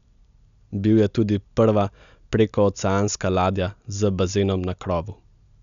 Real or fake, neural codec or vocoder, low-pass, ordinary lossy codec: real; none; 7.2 kHz; none